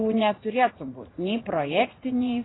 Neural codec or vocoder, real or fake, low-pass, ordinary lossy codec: vocoder, 44.1 kHz, 80 mel bands, Vocos; fake; 7.2 kHz; AAC, 16 kbps